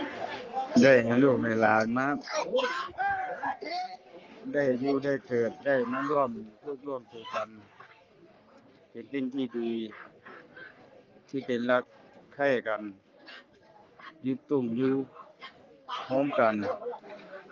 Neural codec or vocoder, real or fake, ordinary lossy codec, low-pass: codec, 44.1 kHz, 3.4 kbps, Pupu-Codec; fake; Opus, 32 kbps; 7.2 kHz